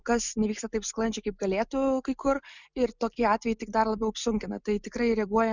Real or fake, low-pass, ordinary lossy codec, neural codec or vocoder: real; 7.2 kHz; Opus, 64 kbps; none